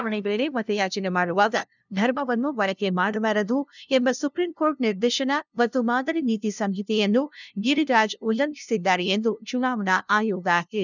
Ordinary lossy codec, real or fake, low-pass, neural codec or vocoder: none; fake; 7.2 kHz; codec, 16 kHz, 0.5 kbps, FunCodec, trained on LibriTTS, 25 frames a second